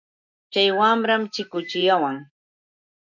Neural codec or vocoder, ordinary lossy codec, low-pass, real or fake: none; MP3, 48 kbps; 7.2 kHz; real